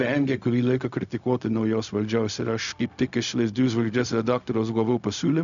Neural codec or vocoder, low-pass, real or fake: codec, 16 kHz, 0.4 kbps, LongCat-Audio-Codec; 7.2 kHz; fake